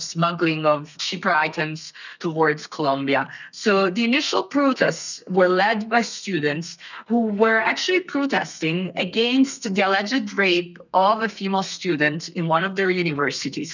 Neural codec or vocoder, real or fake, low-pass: codec, 32 kHz, 1.9 kbps, SNAC; fake; 7.2 kHz